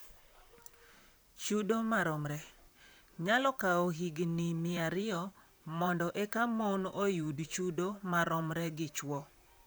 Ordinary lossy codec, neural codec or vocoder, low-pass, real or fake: none; vocoder, 44.1 kHz, 128 mel bands, Pupu-Vocoder; none; fake